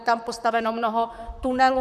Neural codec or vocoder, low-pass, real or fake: vocoder, 44.1 kHz, 128 mel bands, Pupu-Vocoder; 14.4 kHz; fake